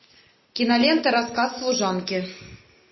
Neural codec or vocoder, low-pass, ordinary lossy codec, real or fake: none; 7.2 kHz; MP3, 24 kbps; real